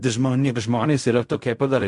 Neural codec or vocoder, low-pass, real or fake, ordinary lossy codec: codec, 16 kHz in and 24 kHz out, 0.4 kbps, LongCat-Audio-Codec, fine tuned four codebook decoder; 10.8 kHz; fake; MP3, 64 kbps